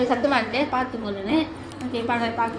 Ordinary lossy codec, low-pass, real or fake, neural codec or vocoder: none; 9.9 kHz; fake; codec, 16 kHz in and 24 kHz out, 2.2 kbps, FireRedTTS-2 codec